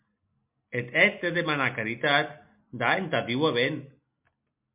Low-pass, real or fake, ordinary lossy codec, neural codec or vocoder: 3.6 kHz; real; MP3, 32 kbps; none